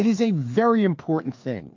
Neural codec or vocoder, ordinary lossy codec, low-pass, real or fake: codec, 16 kHz, 2 kbps, FreqCodec, larger model; MP3, 48 kbps; 7.2 kHz; fake